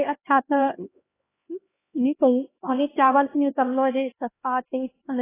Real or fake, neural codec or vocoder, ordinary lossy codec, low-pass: fake; codec, 16 kHz, 1 kbps, X-Codec, HuBERT features, trained on LibriSpeech; AAC, 16 kbps; 3.6 kHz